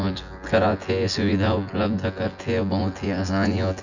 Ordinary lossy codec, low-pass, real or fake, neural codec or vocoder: none; 7.2 kHz; fake; vocoder, 24 kHz, 100 mel bands, Vocos